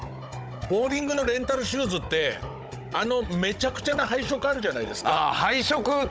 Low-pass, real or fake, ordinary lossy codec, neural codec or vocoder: none; fake; none; codec, 16 kHz, 16 kbps, FunCodec, trained on Chinese and English, 50 frames a second